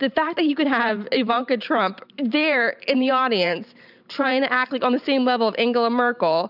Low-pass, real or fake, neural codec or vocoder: 5.4 kHz; fake; vocoder, 44.1 kHz, 128 mel bands every 512 samples, BigVGAN v2